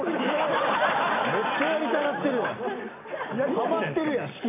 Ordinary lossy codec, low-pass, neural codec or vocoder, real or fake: AAC, 16 kbps; 3.6 kHz; none; real